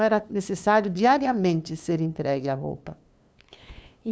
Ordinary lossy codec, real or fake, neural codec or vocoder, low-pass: none; fake; codec, 16 kHz, 2 kbps, FunCodec, trained on LibriTTS, 25 frames a second; none